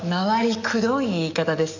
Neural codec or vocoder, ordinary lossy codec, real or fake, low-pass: codec, 44.1 kHz, 7.8 kbps, DAC; none; fake; 7.2 kHz